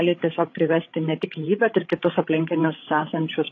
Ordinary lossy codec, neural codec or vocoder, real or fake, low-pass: AAC, 32 kbps; codec, 16 kHz, 16 kbps, FreqCodec, larger model; fake; 7.2 kHz